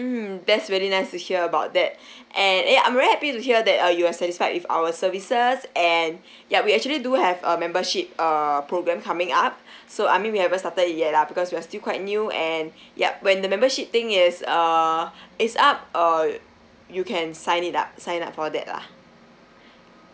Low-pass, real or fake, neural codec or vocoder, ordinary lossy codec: none; real; none; none